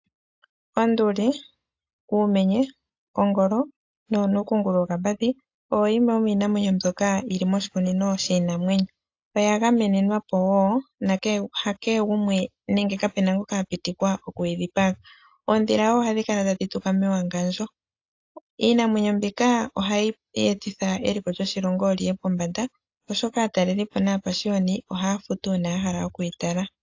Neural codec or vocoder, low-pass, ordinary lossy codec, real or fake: none; 7.2 kHz; AAC, 48 kbps; real